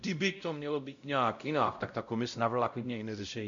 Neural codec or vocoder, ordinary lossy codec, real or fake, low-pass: codec, 16 kHz, 0.5 kbps, X-Codec, WavLM features, trained on Multilingual LibriSpeech; MP3, 96 kbps; fake; 7.2 kHz